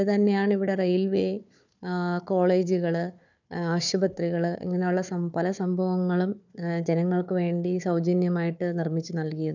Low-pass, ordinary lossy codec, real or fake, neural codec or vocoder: 7.2 kHz; none; fake; codec, 16 kHz, 4 kbps, FunCodec, trained on Chinese and English, 50 frames a second